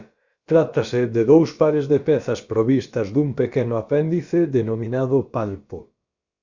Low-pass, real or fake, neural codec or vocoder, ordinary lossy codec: 7.2 kHz; fake; codec, 16 kHz, about 1 kbps, DyCAST, with the encoder's durations; Opus, 64 kbps